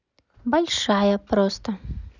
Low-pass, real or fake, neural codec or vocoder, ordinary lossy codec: 7.2 kHz; real; none; none